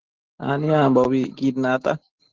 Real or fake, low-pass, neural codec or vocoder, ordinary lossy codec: real; 7.2 kHz; none; Opus, 16 kbps